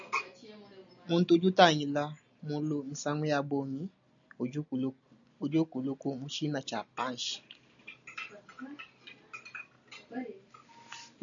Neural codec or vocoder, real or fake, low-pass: none; real; 7.2 kHz